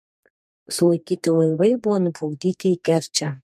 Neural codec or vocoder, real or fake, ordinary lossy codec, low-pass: codec, 32 kHz, 1.9 kbps, SNAC; fake; MP3, 64 kbps; 14.4 kHz